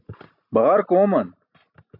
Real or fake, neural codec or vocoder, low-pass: real; none; 5.4 kHz